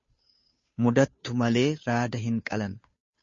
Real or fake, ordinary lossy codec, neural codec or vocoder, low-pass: fake; MP3, 32 kbps; codec, 16 kHz, 2 kbps, FunCodec, trained on Chinese and English, 25 frames a second; 7.2 kHz